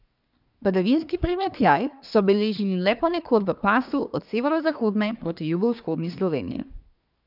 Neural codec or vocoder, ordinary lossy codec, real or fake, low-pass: codec, 24 kHz, 1 kbps, SNAC; none; fake; 5.4 kHz